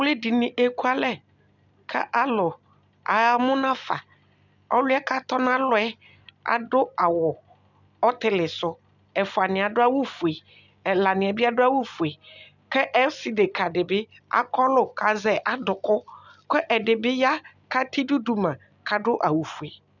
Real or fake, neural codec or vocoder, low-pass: real; none; 7.2 kHz